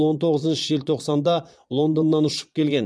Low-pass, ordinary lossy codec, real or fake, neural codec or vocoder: none; none; fake; vocoder, 22.05 kHz, 80 mel bands, Vocos